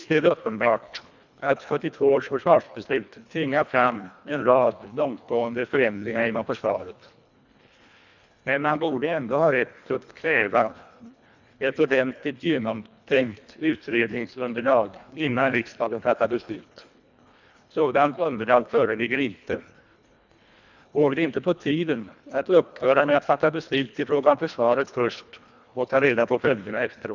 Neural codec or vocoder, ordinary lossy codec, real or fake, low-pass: codec, 24 kHz, 1.5 kbps, HILCodec; none; fake; 7.2 kHz